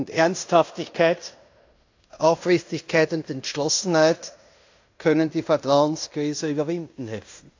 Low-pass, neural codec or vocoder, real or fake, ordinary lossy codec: 7.2 kHz; codec, 16 kHz in and 24 kHz out, 0.9 kbps, LongCat-Audio-Codec, fine tuned four codebook decoder; fake; AAC, 48 kbps